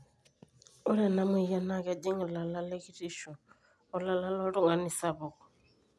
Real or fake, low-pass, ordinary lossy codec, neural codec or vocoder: real; none; none; none